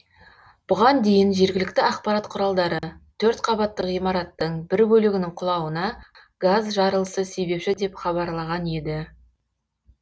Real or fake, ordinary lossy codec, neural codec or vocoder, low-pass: real; none; none; none